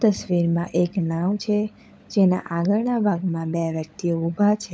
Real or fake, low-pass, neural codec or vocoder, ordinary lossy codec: fake; none; codec, 16 kHz, 16 kbps, FunCodec, trained on LibriTTS, 50 frames a second; none